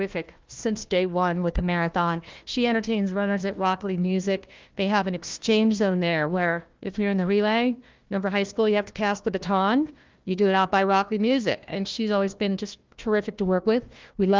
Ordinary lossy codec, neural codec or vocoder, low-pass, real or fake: Opus, 32 kbps; codec, 16 kHz, 1 kbps, FunCodec, trained on Chinese and English, 50 frames a second; 7.2 kHz; fake